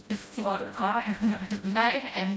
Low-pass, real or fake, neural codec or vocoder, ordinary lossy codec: none; fake; codec, 16 kHz, 0.5 kbps, FreqCodec, smaller model; none